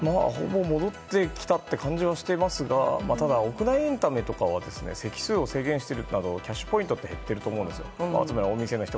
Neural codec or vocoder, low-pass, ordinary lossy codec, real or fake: none; none; none; real